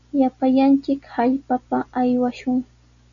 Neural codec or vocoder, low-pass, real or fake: none; 7.2 kHz; real